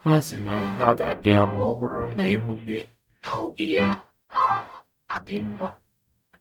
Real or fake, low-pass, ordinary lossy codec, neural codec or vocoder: fake; 19.8 kHz; none; codec, 44.1 kHz, 0.9 kbps, DAC